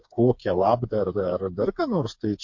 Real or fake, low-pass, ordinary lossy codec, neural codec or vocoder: fake; 7.2 kHz; MP3, 48 kbps; codec, 16 kHz, 4 kbps, FreqCodec, smaller model